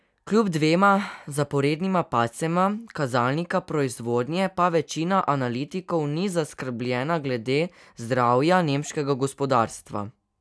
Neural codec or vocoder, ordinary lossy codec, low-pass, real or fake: none; none; none; real